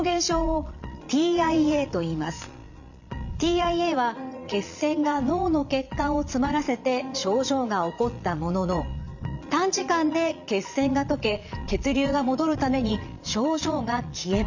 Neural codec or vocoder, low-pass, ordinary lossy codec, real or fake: vocoder, 22.05 kHz, 80 mel bands, Vocos; 7.2 kHz; none; fake